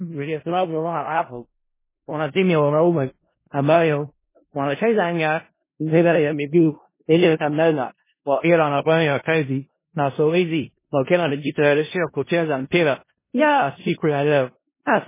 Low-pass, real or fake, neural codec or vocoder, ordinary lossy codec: 3.6 kHz; fake; codec, 16 kHz in and 24 kHz out, 0.4 kbps, LongCat-Audio-Codec, four codebook decoder; MP3, 16 kbps